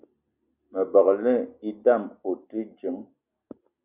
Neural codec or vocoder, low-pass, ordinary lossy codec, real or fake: none; 3.6 kHz; Opus, 64 kbps; real